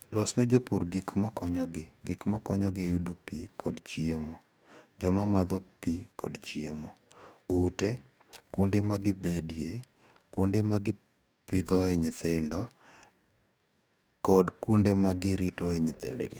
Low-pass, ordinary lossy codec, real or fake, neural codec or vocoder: none; none; fake; codec, 44.1 kHz, 2.6 kbps, DAC